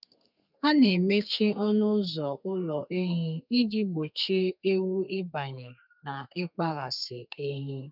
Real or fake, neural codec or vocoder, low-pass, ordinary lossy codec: fake; codec, 32 kHz, 1.9 kbps, SNAC; 5.4 kHz; none